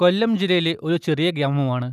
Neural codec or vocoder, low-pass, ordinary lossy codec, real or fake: none; 14.4 kHz; none; real